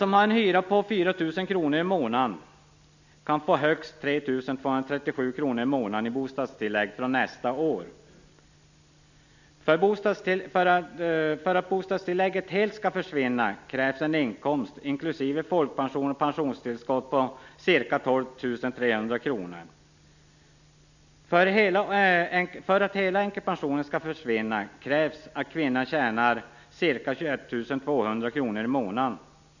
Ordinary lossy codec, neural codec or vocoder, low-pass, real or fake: none; none; 7.2 kHz; real